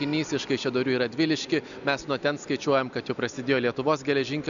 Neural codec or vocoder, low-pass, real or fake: none; 7.2 kHz; real